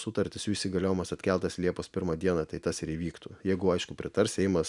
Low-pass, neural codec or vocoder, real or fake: 10.8 kHz; none; real